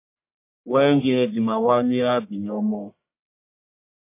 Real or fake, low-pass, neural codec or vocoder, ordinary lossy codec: fake; 3.6 kHz; codec, 44.1 kHz, 1.7 kbps, Pupu-Codec; MP3, 24 kbps